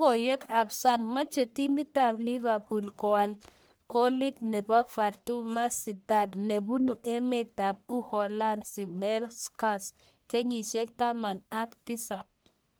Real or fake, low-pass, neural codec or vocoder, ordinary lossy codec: fake; none; codec, 44.1 kHz, 1.7 kbps, Pupu-Codec; none